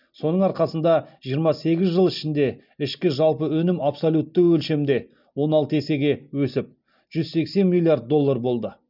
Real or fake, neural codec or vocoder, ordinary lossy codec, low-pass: real; none; none; 5.4 kHz